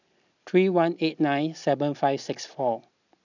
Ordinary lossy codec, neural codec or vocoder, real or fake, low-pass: none; none; real; 7.2 kHz